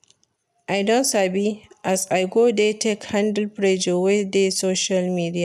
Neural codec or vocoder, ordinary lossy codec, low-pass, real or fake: none; none; 14.4 kHz; real